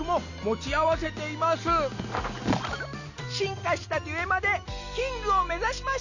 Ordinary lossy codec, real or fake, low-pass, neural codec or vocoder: none; real; 7.2 kHz; none